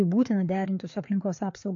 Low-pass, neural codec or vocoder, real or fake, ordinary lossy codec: 7.2 kHz; codec, 16 kHz, 4 kbps, FreqCodec, larger model; fake; AAC, 48 kbps